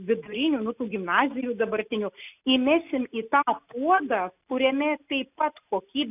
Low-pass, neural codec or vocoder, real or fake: 3.6 kHz; none; real